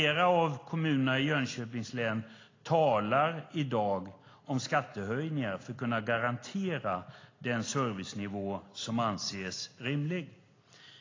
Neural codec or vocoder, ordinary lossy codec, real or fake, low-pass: none; AAC, 32 kbps; real; 7.2 kHz